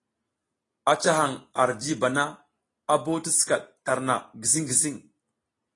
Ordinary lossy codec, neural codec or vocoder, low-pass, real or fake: AAC, 32 kbps; none; 10.8 kHz; real